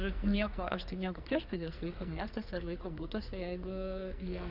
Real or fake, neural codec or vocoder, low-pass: fake; codec, 44.1 kHz, 2.6 kbps, SNAC; 5.4 kHz